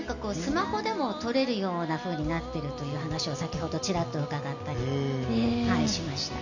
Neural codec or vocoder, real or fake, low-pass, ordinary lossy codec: none; real; 7.2 kHz; none